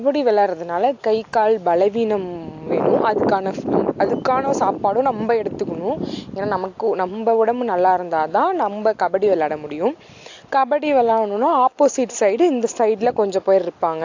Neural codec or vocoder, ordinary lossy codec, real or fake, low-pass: none; AAC, 48 kbps; real; 7.2 kHz